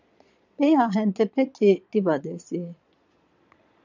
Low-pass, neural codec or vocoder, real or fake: 7.2 kHz; vocoder, 22.05 kHz, 80 mel bands, Vocos; fake